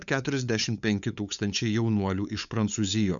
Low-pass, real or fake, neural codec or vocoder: 7.2 kHz; fake; codec, 16 kHz, 8 kbps, FunCodec, trained on LibriTTS, 25 frames a second